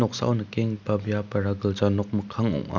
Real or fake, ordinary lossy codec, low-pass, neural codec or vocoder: real; none; 7.2 kHz; none